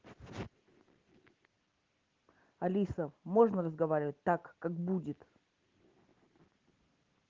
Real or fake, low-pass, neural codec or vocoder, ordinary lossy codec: real; 7.2 kHz; none; Opus, 16 kbps